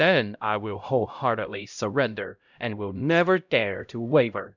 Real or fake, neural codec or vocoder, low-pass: fake; codec, 16 kHz, 0.5 kbps, X-Codec, HuBERT features, trained on LibriSpeech; 7.2 kHz